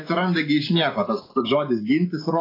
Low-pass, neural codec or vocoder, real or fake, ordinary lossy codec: 5.4 kHz; none; real; AAC, 24 kbps